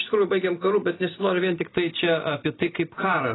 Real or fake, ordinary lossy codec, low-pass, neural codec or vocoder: real; AAC, 16 kbps; 7.2 kHz; none